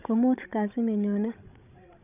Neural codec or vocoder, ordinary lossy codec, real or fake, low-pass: codec, 16 kHz, 16 kbps, FreqCodec, larger model; none; fake; 3.6 kHz